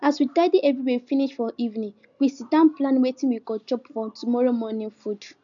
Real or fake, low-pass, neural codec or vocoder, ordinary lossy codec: real; 7.2 kHz; none; none